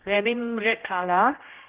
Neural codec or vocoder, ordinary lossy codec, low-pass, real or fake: codec, 16 kHz, 0.5 kbps, X-Codec, HuBERT features, trained on general audio; Opus, 16 kbps; 3.6 kHz; fake